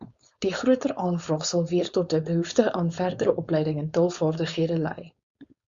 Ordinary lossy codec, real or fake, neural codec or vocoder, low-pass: Opus, 64 kbps; fake; codec, 16 kHz, 4.8 kbps, FACodec; 7.2 kHz